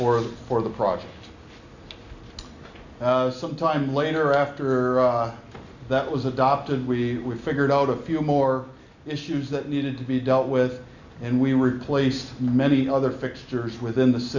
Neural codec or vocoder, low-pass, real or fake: none; 7.2 kHz; real